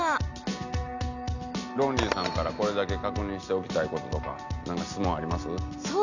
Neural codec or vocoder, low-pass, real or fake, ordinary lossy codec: none; 7.2 kHz; real; none